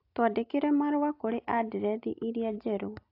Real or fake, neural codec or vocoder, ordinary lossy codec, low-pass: real; none; AAC, 32 kbps; 5.4 kHz